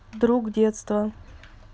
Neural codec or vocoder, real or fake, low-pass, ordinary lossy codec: none; real; none; none